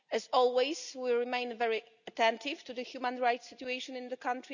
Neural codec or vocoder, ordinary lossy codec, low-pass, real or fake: none; none; 7.2 kHz; real